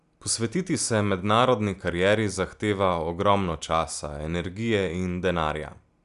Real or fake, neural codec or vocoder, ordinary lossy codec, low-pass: real; none; none; 10.8 kHz